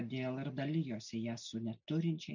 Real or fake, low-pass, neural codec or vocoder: real; 7.2 kHz; none